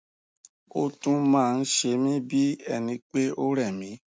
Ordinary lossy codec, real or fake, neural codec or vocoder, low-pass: none; real; none; none